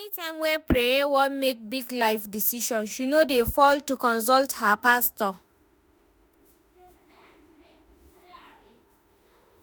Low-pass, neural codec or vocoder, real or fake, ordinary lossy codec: none; autoencoder, 48 kHz, 32 numbers a frame, DAC-VAE, trained on Japanese speech; fake; none